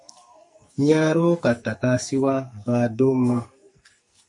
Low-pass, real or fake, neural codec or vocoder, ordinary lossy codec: 10.8 kHz; fake; codec, 44.1 kHz, 2.6 kbps, SNAC; MP3, 48 kbps